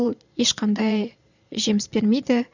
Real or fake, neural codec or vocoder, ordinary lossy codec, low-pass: fake; vocoder, 22.05 kHz, 80 mel bands, Vocos; none; 7.2 kHz